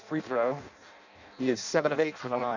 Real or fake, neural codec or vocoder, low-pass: fake; codec, 16 kHz in and 24 kHz out, 0.6 kbps, FireRedTTS-2 codec; 7.2 kHz